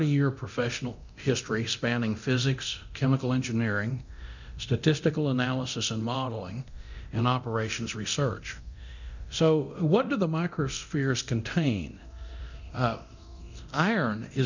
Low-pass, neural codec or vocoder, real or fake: 7.2 kHz; codec, 24 kHz, 0.9 kbps, DualCodec; fake